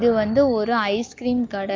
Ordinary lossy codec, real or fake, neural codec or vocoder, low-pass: Opus, 32 kbps; real; none; 7.2 kHz